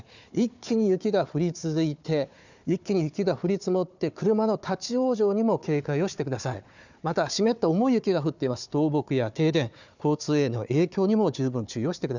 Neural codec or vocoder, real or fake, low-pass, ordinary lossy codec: codec, 16 kHz, 4 kbps, FunCodec, trained on Chinese and English, 50 frames a second; fake; 7.2 kHz; none